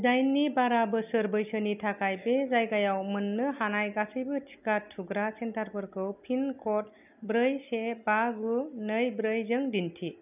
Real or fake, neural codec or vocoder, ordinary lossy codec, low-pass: real; none; none; 3.6 kHz